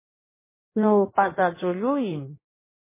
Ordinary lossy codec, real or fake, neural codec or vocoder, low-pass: MP3, 16 kbps; fake; codec, 16 kHz in and 24 kHz out, 1.1 kbps, FireRedTTS-2 codec; 3.6 kHz